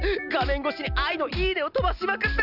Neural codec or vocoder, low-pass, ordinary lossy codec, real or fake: none; 5.4 kHz; none; real